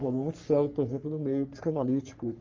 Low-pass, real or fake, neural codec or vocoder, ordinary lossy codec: 7.2 kHz; fake; codec, 44.1 kHz, 3.4 kbps, Pupu-Codec; Opus, 32 kbps